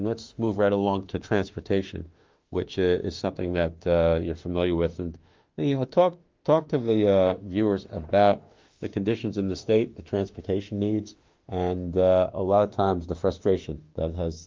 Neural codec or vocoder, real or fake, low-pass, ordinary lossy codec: autoencoder, 48 kHz, 32 numbers a frame, DAC-VAE, trained on Japanese speech; fake; 7.2 kHz; Opus, 32 kbps